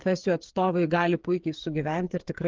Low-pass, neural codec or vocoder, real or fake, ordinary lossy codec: 7.2 kHz; codec, 16 kHz, 8 kbps, FreqCodec, smaller model; fake; Opus, 16 kbps